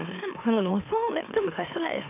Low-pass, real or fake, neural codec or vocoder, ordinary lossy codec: 3.6 kHz; fake; autoencoder, 44.1 kHz, a latent of 192 numbers a frame, MeloTTS; none